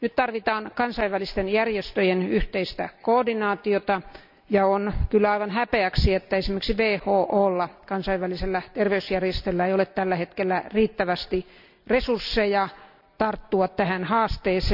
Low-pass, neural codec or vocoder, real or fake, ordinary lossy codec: 5.4 kHz; none; real; none